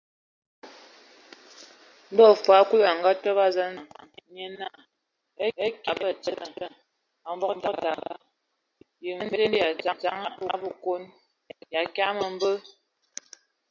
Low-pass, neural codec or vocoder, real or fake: 7.2 kHz; none; real